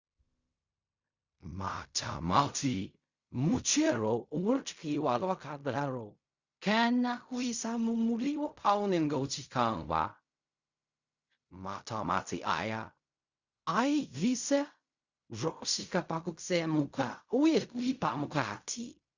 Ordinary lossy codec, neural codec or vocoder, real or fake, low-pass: Opus, 64 kbps; codec, 16 kHz in and 24 kHz out, 0.4 kbps, LongCat-Audio-Codec, fine tuned four codebook decoder; fake; 7.2 kHz